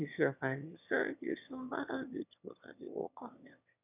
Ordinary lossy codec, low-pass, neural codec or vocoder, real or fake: none; 3.6 kHz; autoencoder, 22.05 kHz, a latent of 192 numbers a frame, VITS, trained on one speaker; fake